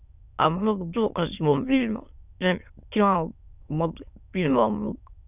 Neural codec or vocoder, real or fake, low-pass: autoencoder, 22.05 kHz, a latent of 192 numbers a frame, VITS, trained on many speakers; fake; 3.6 kHz